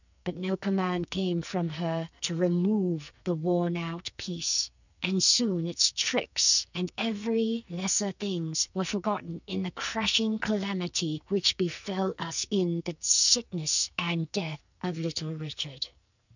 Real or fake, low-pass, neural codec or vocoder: fake; 7.2 kHz; codec, 44.1 kHz, 2.6 kbps, SNAC